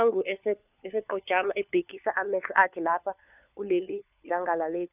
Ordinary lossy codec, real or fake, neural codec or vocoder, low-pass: none; fake; codec, 16 kHz, 4 kbps, FunCodec, trained on LibriTTS, 50 frames a second; 3.6 kHz